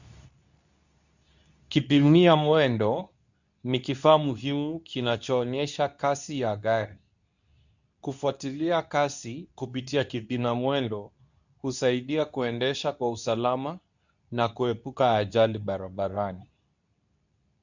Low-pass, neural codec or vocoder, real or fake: 7.2 kHz; codec, 24 kHz, 0.9 kbps, WavTokenizer, medium speech release version 2; fake